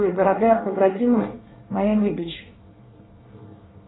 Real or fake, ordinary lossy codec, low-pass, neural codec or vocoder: fake; AAC, 16 kbps; 7.2 kHz; codec, 24 kHz, 1 kbps, SNAC